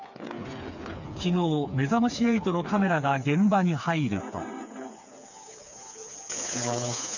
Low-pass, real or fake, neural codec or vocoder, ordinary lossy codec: 7.2 kHz; fake; codec, 16 kHz, 4 kbps, FreqCodec, smaller model; none